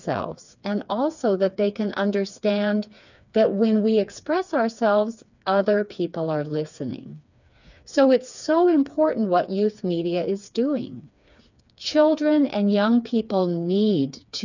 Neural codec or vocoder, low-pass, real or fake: codec, 16 kHz, 4 kbps, FreqCodec, smaller model; 7.2 kHz; fake